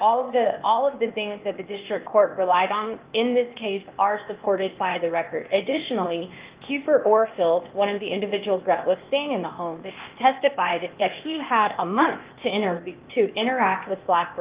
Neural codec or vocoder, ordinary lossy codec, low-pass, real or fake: codec, 16 kHz, 0.8 kbps, ZipCodec; Opus, 32 kbps; 3.6 kHz; fake